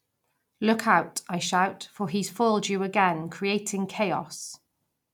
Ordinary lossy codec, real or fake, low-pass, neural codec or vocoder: none; real; 19.8 kHz; none